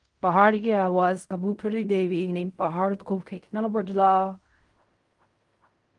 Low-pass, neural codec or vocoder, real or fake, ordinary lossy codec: 10.8 kHz; codec, 16 kHz in and 24 kHz out, 0.4 kbps, LongCat-Audio-Codec, fine tuned four codebook decoder; fake; Opus, 32 kbps